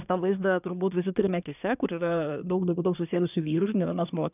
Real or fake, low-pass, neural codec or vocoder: fake; 3.6 kHz; codec, 24 kHz, 1 kbps, SNAC